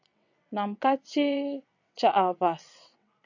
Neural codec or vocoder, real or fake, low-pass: vocoder, 22.05 kHz, 80 mel bands, WaveNeXt; fake; 7.2 kHz